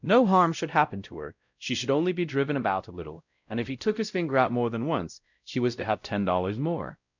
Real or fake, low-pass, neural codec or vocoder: fake; 7.2 kHz; codec, 16 kHz, 0.5 kbps, X-Codec, WavLM features, trained on Multilingual LibriSpeech